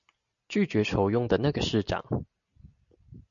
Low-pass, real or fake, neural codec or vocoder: 7.2 kHz; real; none